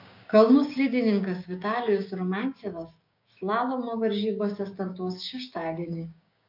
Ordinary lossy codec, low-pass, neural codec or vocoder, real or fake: MP3, 48 kbps; 5.4 kHz; codec, 16 kHz, 6 kbps, DAC; fake